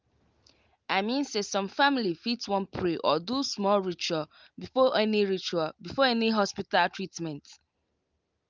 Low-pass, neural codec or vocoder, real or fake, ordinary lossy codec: 7.2 kHz; none; real; Opus, 24 kbps